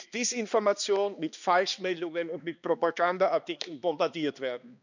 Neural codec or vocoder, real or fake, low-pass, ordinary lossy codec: codec, 16 kHz, 2 kbps, X-Codec, HuBERT features, trained on balanced general audio; fake; 7.2 kHz; none